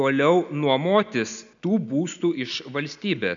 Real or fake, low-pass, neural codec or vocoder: real; 7.2 kHz; none